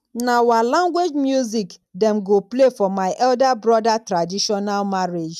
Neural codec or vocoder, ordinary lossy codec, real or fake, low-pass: none; none; real; 14.4 kHz